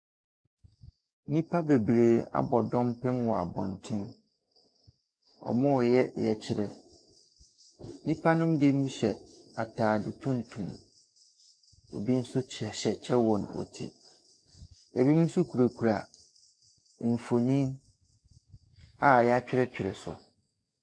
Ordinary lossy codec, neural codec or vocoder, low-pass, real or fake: MP3, 96 kbps; codec, 44.1 kHz, 7.8 kbps, DAC; 9.9 kHz; fake